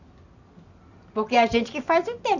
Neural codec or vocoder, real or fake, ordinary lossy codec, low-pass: none; real; none; 7.2 kHz